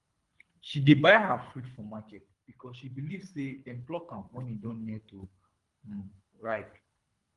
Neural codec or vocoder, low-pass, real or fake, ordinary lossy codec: codec, 24 kHz, 3 kbps, HILCodec; 10.8 kHz; fake; Opus, 32 kbps